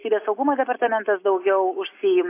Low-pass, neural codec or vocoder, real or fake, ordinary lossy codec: 3.6 kHz; none; real; AAC, 24 kbps